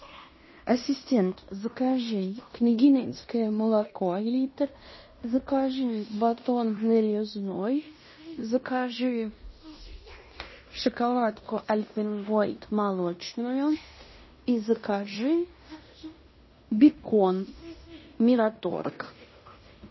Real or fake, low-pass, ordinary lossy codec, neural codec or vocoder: fake; 7.2 kHz; MP3, 24 kbps; codec, 16 kHz in and 24 kHz out, 0.9 kbps, LongCat-Audio-Codec, fine tuned four codebook decoder